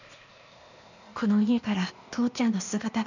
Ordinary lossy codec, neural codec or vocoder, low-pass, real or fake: none; codec, 16 kHz, 0.8 kbps, ZipCodec; 7.2 kHz; fake